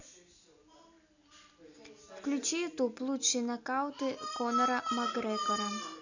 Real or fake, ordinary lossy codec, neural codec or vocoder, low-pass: real; none; none; 7.2 kHz